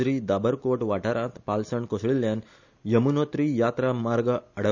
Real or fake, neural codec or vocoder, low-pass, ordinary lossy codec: real; none; 7.2 kHz; none